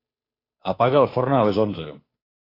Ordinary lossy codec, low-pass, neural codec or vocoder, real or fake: AAC, 24 kbps; 5.4 kHz; codec, 16 kHz, 2 kbps, FunCodec, trained on Chinese and English, 25 frames a second; fake